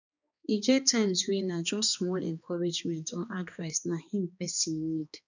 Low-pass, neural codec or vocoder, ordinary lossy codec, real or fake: 7.2 kHz; codec, 16 kHz, 2 kbps, X-Codec, HuBERT features, trained on balanced general audio; none; fake